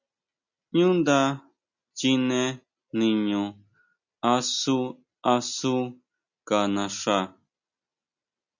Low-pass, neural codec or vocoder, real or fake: 7.2 kHz; none; real